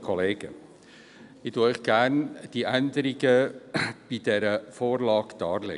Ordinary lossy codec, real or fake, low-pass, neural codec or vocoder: none; real; 10.8 kHz; none